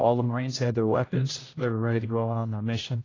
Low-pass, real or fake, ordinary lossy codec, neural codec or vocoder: 7.2 kHz; fake; AAC, 32 kbps; codec, 16 kHz, 0.5 kbps, X-Codec, HuBERT features, trained on general audio